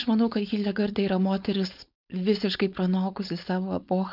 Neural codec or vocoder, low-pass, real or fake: codec, 16 kHz, 4.8 kbps, FACodec; 5.4 kHz; fake